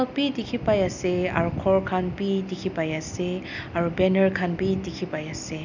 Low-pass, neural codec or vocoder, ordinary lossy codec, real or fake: 7.2 kHz; none; none; real